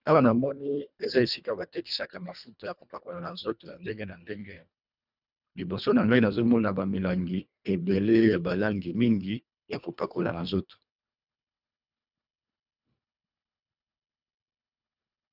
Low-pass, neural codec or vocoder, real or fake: 5.4 kHz; codec, 24 kHz, 1.5 kbps, HILCodec; fake